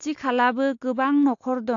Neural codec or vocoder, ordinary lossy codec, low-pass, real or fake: none; AAC, 48 kbps; 7.2 kHz; real